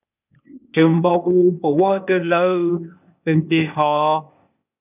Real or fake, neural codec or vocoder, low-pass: fake; codec, 16 kHz, 0.8 kbps, ZipCodec; 3.6 kHz